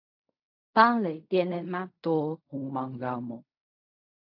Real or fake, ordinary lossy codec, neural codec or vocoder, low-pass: fake; AAC, 48 kbps; codec, 16 kHz in and 24 kHz out, 0.4 kbps, LongCat-Audio-Codec, fine tuned four codebook decoder; 5.4 kHz